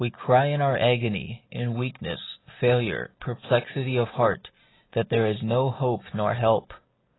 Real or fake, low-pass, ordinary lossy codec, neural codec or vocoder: real; 7.2 kHz; AAC, 16 kbps; none